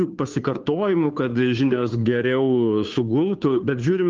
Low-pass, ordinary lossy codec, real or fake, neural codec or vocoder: 7.2 kHz; Opus, 32 kbps; fake; codec, 16 kHz, 2 kbps, FunCodec, trained on Chinese and English, 25 frames a second